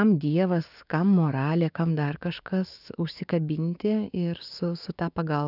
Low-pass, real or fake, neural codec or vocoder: 5.4 kHz; fake; codec, 16 kHz, 6 kbps, DAC